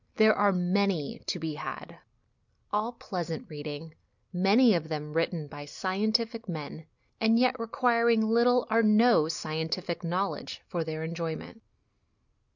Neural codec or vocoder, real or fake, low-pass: none; real; 7.2 kHz